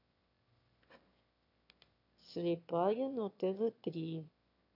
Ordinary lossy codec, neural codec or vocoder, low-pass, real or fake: none; autoencoder, 22.05 kHz, a latent of 192 numbers a frame, VITS, trained on one speaker; 5.4 kHz; fake